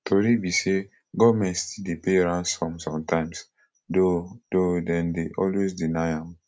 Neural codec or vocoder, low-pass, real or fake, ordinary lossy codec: none; none; real; none